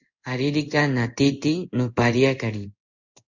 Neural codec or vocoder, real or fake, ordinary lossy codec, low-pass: codec, 16 kHz in and 24 kHz out, 1 kbps, XY-Tokenizer; fake; Opus, 64 kbps; 7.2 kHz